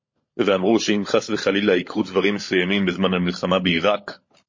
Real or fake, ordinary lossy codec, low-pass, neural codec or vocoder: fake; MP3, 32 kbps; 7.2 kHz; codec, 16 kHz, 16 kbps, FunCodec, trained on LibriTTS, 50 frames a second